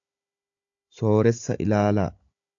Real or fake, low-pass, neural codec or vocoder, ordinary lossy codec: fake; 7.2 kHz; codec, 16 kHz, 16 kbps, FunCodec, trained on Chinese and English, 50 frames a second; AAC, 48 kbps